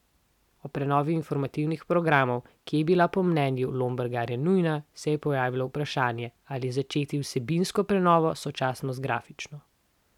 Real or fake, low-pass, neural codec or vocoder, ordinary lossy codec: real; 19.8 kHz; none; none